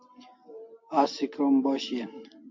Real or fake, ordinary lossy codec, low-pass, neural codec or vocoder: real; MP3, 48 kbps; 7.2 kHz; none